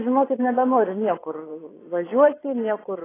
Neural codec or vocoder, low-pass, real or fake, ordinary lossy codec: vocoder, 22.05 kHz, 80 mel bands, Vocos; 3.6 kHz; fake; AAC, 16 kbps